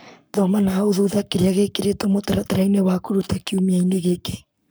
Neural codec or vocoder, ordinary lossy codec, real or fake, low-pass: codec, 44.1 kHz, 7.8 kbps, Pupu-Codec; none; fake; none